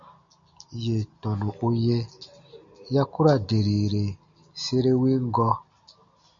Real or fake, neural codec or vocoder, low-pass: real; none; 7.2 kHz